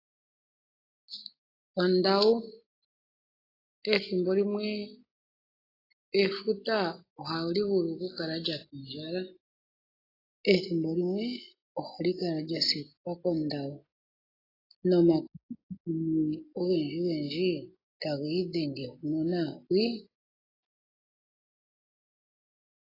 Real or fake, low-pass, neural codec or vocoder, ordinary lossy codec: real; 5.4 kHz; none; AAC, 24 kbps